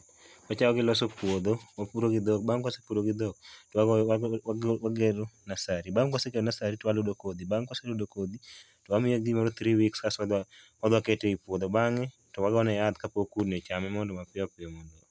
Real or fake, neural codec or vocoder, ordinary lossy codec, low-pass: real; none; none; none